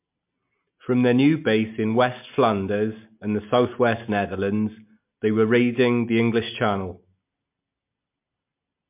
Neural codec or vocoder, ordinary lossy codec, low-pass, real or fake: none; MP3, 32 kbps; 3.6 kHz; real